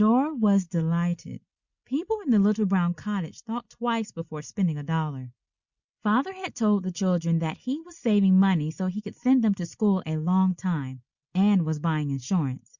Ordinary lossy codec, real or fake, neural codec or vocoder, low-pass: Opus, 64 kbps; real; none; 7.2 kHz